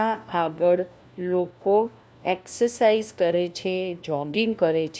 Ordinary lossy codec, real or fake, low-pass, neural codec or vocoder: none; fake; none; codec, 16 kHz, 1 kbps, FunCodec, trained on LibriTTS, 50 frames a second